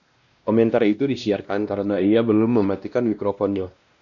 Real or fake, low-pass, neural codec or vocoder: fake; 7.2 kHz; codec, 16 kHz, 1 kbps, X-Codec, WavLM features, trained on Multilingual LibriSpeech